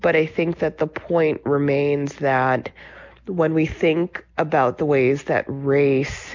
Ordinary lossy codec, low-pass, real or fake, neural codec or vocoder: MP3, 64 kbps; 7.2 kHz; real; none